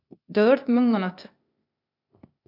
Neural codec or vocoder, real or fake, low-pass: codec, 16 kHz, 0.8 kbps, ZipCodec; fake; 5.4 kHz